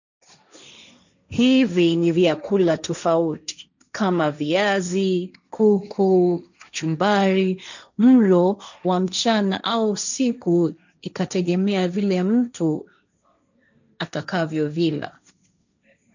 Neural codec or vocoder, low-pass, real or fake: codec, 16 kHz, 1.1 kbps, Voila-Tokenizer; 7.2 kHz; fake